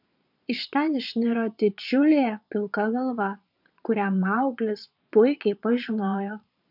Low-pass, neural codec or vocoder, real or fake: 5.4 kHz; vocoder, 22.05 kHz, 80 mel bands, Vocos; fake